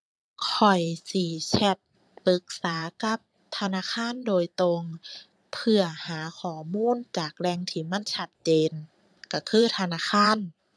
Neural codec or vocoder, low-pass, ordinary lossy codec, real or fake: vocoder, 22.05 kHz, 80 mel bands, Vocos; none; none; fake